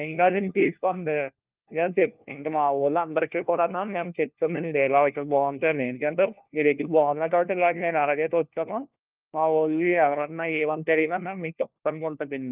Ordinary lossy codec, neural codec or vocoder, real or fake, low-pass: Opus, 24 kbps; codec, 16 kHz, 1 kbps, FunCodec, trained on LibriTTS, 50 frames a second; fake; 3.6 kHz